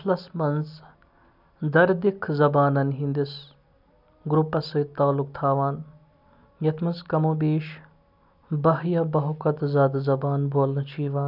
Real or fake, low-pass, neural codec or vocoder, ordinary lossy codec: real; 5.4 kHz; none; none